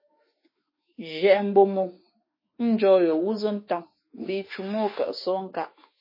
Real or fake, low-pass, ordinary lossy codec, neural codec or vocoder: fake; 5.4 kHz; MP3, 24 kbps; autoencoder, 48 kHz, 32 numbers a frame, DAC-VAE, trained on Japanese speech